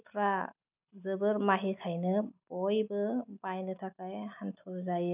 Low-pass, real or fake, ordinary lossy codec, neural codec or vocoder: 3.6 kHz; real; none; none